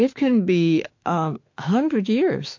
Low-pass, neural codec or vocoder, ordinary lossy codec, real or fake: 7.2 kHz; autoencoder, 48 kHz, 32 numbers a frame, DAC-VAE, trained on Japanese speech; MP3, 48 kbps; fake